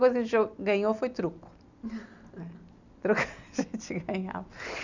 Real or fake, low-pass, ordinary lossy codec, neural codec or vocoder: real; 7.2 kHz; none; none